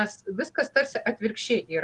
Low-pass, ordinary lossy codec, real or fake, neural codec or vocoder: 10.8 kHz; Opus, 24 kbps; real; none